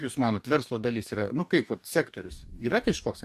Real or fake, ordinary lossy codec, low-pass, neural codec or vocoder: fake; AAC, 96 kbps; 14.4 kHz; codec, 44.1 kHz, 2.6 kbps, DAC